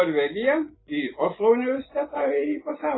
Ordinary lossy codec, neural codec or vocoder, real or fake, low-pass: AAC, 16 kbps; none; real; 7.2 kHz